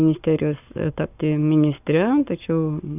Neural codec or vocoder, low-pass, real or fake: codec, 44.1 kHz, 7.8 kbps, Pupu-Codec; 3.6 kHz; fake